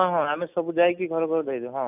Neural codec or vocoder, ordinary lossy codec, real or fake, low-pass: none; none; real; 3.6 kHz